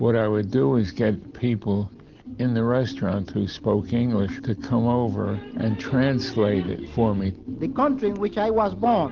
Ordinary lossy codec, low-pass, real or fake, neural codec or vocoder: Opus, 16 kbps; 7.2 kHz; real; none